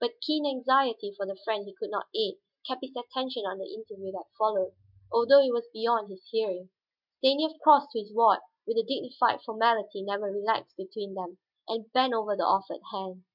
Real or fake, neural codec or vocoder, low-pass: real; none; 5.4 kHz